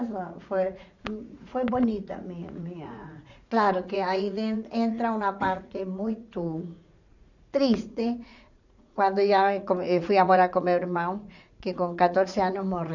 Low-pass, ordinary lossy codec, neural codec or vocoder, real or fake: 7.2 kHz; none; vocoder, 44.1 kHz, 80 mel bands, Vocos; fake